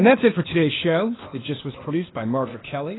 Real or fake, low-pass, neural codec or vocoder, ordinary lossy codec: fake; 7.2 kHz; codec, 16 kHz, 0.8 kbps, ZipCodec; AAC, 16 kbps